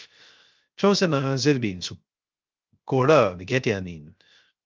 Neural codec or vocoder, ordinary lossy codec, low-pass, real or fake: codec, 16 kHz, 0.3 kbps, FocalCodec; Opus, 24 kbps; 7.2 kHz; fake